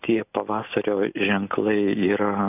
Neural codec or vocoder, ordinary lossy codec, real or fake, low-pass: none; AAC, 32 kbps; real; 3.6 kHz